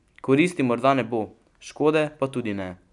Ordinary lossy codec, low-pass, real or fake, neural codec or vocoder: none; 10.8 kHz; real; none